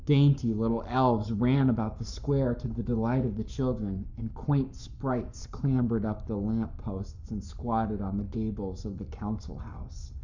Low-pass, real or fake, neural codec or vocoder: 7.2 kHz; fake; codec, 44.1 kHz, 7.8 kbps, Pupu-Codec